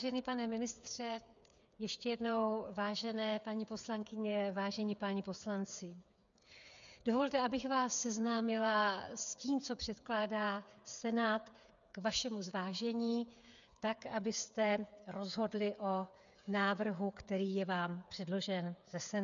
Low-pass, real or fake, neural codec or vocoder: 7.2 kHz; fake; codec, 16 kHz, 8 kbps, FreqCodec, smaller model